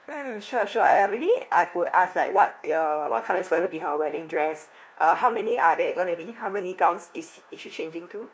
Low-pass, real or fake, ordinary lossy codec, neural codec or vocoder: none; fake; none; codec, 16 kHz, 1 kbps, FunCodec, trained on LibriTTS, 50 frames a second